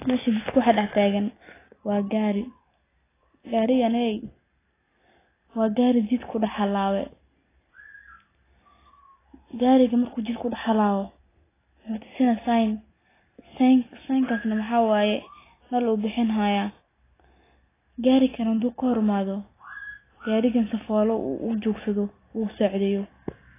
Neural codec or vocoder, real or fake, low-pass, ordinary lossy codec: none; real; 3.6 kHz; AAC, 16 kbps